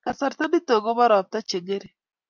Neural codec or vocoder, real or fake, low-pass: none; real; 7.2 kHz